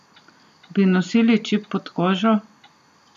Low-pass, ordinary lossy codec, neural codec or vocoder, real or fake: 14.4 kHz; none; none; real